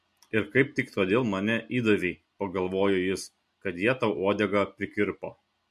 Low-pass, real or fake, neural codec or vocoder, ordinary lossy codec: 14.4 kHz; real; none; MP3, 64 kbps